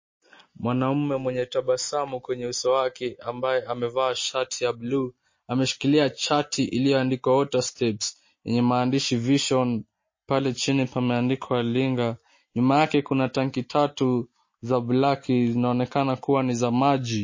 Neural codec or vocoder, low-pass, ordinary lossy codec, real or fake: none; 7.2 kHz; MP3, 32 kbps; real